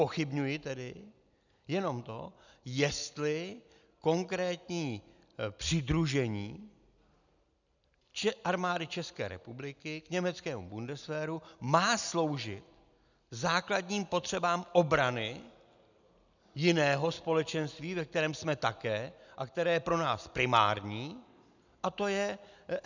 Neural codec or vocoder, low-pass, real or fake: none; 7.2 kHz; real